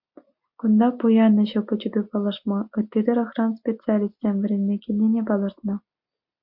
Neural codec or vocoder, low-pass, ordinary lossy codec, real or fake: none; 5.4 kHz; MP3, 48 kbps; real